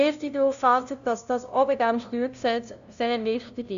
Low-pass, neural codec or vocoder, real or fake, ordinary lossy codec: 7.2 kHz; codec, 16 kHz, 0.5 kbps, FunCodec, trained on LibriTTS, 25 frames a second; fake; none